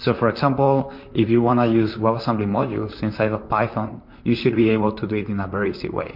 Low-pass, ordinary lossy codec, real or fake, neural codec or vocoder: 5.4 kHz; MP3, 32 kbps; fake; vocoder, 44.1 kHz, 128 mel bands, Pupu-Vocoder